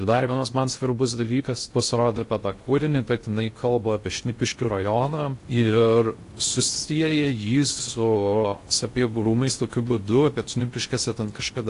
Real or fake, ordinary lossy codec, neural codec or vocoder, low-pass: fake; AAC, 48 kbps; codec, 16 kHz in and 24 kHz out, 0.6 kbps, FocalCodec, streaming, 2048 codes; 10.8 kHz